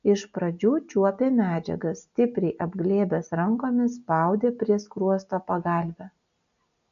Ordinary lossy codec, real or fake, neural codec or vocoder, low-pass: MP3, 96 kbps; real; none; 7.2 kHz